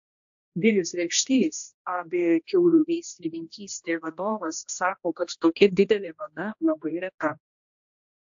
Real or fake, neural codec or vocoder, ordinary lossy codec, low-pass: fake; codec, 16 kHz, 1 kbps, X-Codec, HuBERT features, trained on general audio; AAC, 64 kbps; 7.2 kHz